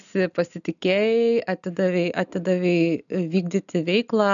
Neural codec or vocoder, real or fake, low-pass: none; real; 7.2 kHz